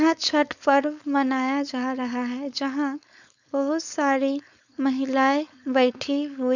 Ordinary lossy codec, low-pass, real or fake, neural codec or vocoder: none; 7.2 kHz; fake; codec, 16 kHz, 4.8 kbps, FACodec